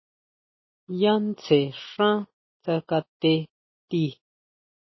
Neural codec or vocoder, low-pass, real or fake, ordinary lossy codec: none; 7.2 kHz; real; MP3, 24 kbps